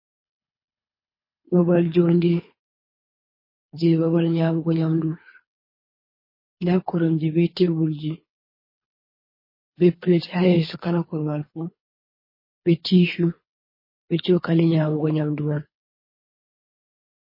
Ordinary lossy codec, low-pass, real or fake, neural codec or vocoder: MP3, 24 kbps; 5.4 kHz; fake; codec, 24 kHz, 3 kbps, HILCodec